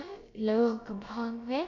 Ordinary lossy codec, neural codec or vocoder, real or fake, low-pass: none; codec, 16 kHz, about 1 kbps, DyCAST, with the encoder's durations; fake; 7.2 kHz